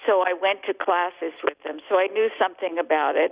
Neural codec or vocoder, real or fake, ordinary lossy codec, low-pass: none; real; Opus, 64 kbps; 3.6 kHz